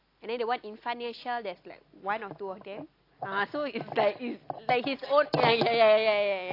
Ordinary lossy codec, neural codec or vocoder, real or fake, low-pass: AAC, 32 kbps; none; real; 5.4 kHz